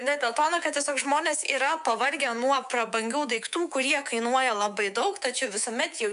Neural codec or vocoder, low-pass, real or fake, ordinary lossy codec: codec, 24 kHz, 3.1 kbps, DualCodec; 10.8 kHz; fake; MP3, 96 kbps